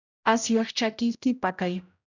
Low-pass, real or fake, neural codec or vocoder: 7.2 kHz; fake; codec, 16 kHz, 0.5 kbps, X-Codec, HuBERT features, trained on general audio